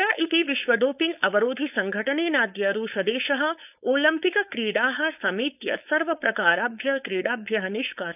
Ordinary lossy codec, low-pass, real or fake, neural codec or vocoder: none; 3.6 kHz; fake; codec, 16 kHz, 4.8 kbps, FACodec